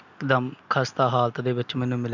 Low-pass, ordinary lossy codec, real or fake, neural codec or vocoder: 7.2 kHz; none; real; none